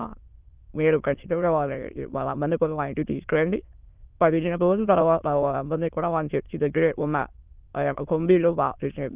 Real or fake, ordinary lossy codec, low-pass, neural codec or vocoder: fake; Opus, 24 kbps; 3.6 kHz; autoencoder, 22.05 kHz, a latent of 192 numbers a frame, VITS, trained on many speakers